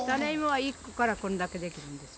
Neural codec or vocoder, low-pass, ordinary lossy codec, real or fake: none; none; none; real